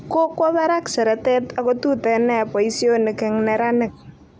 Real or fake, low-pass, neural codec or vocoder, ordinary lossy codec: real; none; none; none